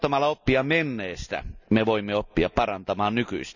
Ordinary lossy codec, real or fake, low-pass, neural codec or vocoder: none; real; 7.2 kHz; none